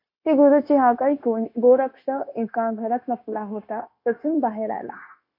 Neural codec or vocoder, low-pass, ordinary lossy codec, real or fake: codec, 16 kHz, 0.9 kbps, LongCat-Audio-Codec; 5.4 kHz; AAC, 32 kbps; fake